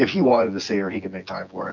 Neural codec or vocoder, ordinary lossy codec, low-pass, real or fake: vocoder, 24 kHz, 100 mel bands, Vocos; MP3, 48 kbps; 7.2 kHz; fake